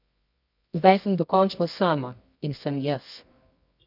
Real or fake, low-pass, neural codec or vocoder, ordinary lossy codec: fake; 5.4 kHz; codec, 24 kHz, 0.9 kbps, WavTokenizer, medium music audio release; none